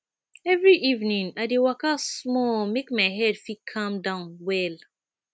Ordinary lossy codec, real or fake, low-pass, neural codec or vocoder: none; real; none; none